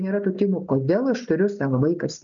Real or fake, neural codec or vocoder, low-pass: real; none; 7.2 kHz